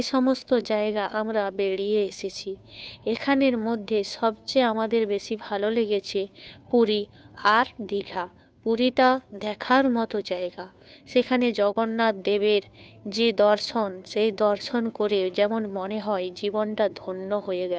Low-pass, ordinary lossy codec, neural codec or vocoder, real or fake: none; none; codec, 16 kHz, 2 kbps, FunCodec, trained on Chinese and English, 25 frames a second; fake